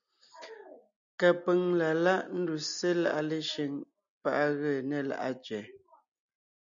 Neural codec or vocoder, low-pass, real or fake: none; 7.2 kHz; real